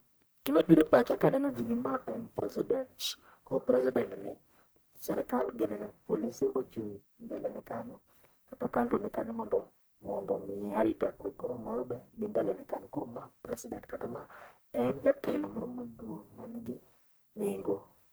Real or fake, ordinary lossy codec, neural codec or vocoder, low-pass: fake; none; codec, 44.1 kHz, 1.7 kbps, Pupu-Codec; none